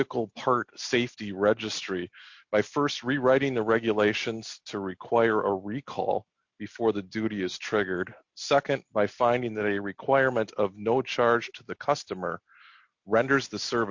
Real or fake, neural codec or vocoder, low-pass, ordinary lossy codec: real; none; 7.2 kHz; MP3, 48 kbps